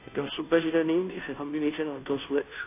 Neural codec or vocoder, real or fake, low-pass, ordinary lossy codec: codec, 16 kHz, 0.5 kbps, FunCodec, trained on Chinese and English, 25 frames a second; fake; 3.6 kHz; AAC, 24 kbps